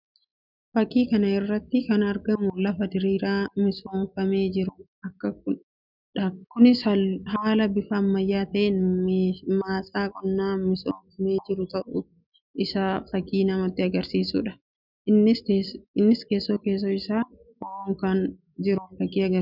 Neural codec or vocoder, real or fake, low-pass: none; real; 5.4 kHz